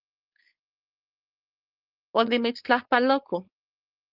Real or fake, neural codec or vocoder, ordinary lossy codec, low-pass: fake; codec, 16 kHz, 4.8 kbps, FACodec; Opus, 24 kbps; 5.4 kHz